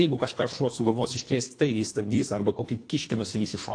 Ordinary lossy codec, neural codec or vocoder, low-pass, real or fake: AAC, 48 kbps; codec, 24 kHz, 1.5 kbps, HILCodec; 9.9 kHz; fake